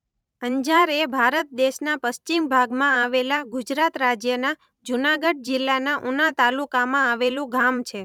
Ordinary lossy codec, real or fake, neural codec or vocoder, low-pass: none; fake; vocoder, 44.1 kHz, 128 mel bands every 512 samples, BigVGAN v2; 19.8 kHz